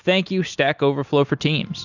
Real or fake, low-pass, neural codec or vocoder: real; 7.2 kHz; none